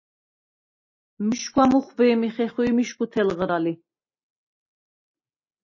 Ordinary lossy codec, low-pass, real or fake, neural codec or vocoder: MP3, 32 kbps; 7.2 kHz; real; none